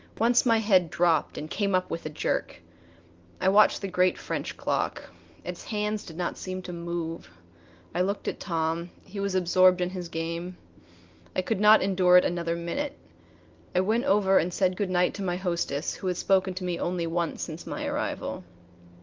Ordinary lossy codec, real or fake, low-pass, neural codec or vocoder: Opus, 24 kbps; real; 7.2 kHz; none